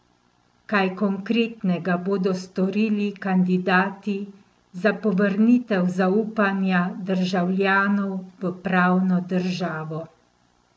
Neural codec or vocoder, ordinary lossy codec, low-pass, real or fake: none; none; none; real